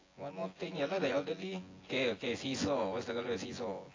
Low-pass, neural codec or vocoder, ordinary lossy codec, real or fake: 7.2 kHz; vocoder, 24 kHz, 100 mel bands, Vocos; AAC, 32 kbps; fake